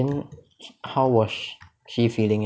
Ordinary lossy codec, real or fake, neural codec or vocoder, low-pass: none; real; none; none